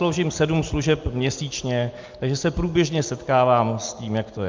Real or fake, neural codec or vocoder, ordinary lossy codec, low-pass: real; none; Opus, 24 kbps; 7.2 kHz